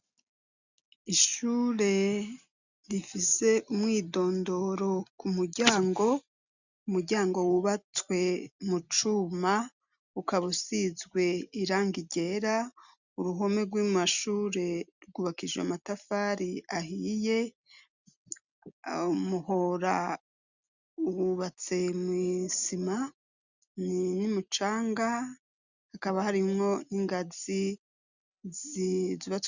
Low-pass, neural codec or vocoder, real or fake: 7.2 kHz; none; real